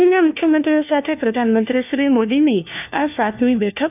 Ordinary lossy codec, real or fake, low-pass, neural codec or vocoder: none; fake; 3.6 kHz; codec, 16 kHz, 1 kbps, FunCodec, trained on LibriTTS, 50 frames a second